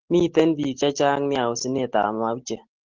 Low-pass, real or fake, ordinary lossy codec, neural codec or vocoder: 7.2 kHz; real; Opus, 16 kbps; none